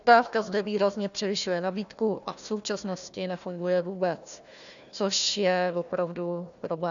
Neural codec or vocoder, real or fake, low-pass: codec, 16 kHz, 1 kbps, FunCodec, trained on Chinese and English, 50 frames a second; fake; 7.2 kHz